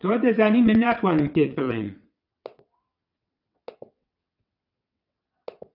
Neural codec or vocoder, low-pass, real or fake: vocoder, 22.05 kHz, 80 mel bands, WaveNeXt; 5.4 kHz; fake